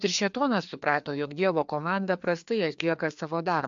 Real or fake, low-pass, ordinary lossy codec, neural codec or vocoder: fake; 7.2 kHz; AAC, 64 kbps; codec, 16 kHz, 2 kbps, FreqCodec, larger model